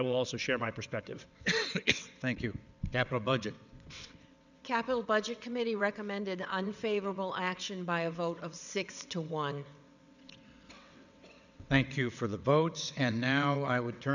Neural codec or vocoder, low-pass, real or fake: vocoder, 22.05 kHz, 80 mel bands, WaveNeXt; 7.2 kHz; fake